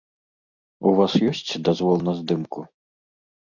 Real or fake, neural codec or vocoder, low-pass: real; none; 7.2 kHz